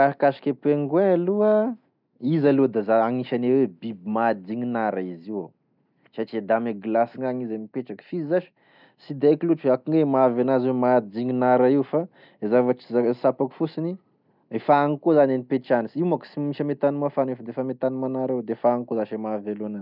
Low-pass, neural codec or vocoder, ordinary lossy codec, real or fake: 5.4 kHz; none; none; real